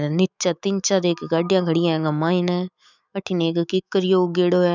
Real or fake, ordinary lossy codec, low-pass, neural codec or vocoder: fake; none; 7.2 kHz; autoencoder, 48 kHz, 128 numbers a frame, DAC-VAE, trained on Japanese speech